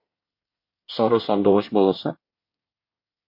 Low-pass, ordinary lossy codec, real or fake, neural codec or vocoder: 5.4 kHz; MP3, 32 kbps; fake; codec, 24 kHz, 1 kbps, SNAC